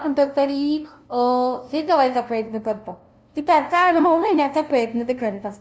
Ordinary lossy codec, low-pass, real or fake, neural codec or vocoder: none; none; fake; codec, 16 kHz, 0.5 kbps, FunCodec, trained on LibriTTS, 25 frames a second